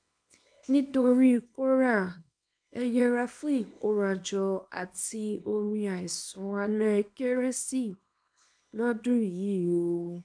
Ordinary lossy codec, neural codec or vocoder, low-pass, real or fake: none; codec, 24 kHz, 0.9 kbps, WavTokenizer, small release; 9.9 kHz; fake